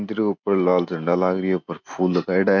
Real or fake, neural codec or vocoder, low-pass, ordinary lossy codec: real; none; 7.2 kHz; MP3, 48 kbps